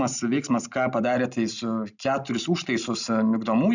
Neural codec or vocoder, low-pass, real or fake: none; 7.2 kHz; real